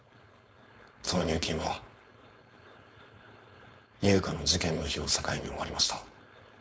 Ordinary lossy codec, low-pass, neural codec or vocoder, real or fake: none; none; codec, 16 kHz, 4.8 kbps, FACodec; fake